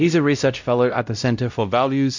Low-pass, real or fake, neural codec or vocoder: 7.2 kHz; fake; codec, 16 kHz, 0.5 kbps, X-Codec, WavLM features, trained on Multilingual LibriSpeech